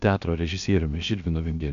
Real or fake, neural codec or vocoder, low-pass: fake; codec, 16 kHz, 0.7 kbps, FocalCodec; 7.2 kHz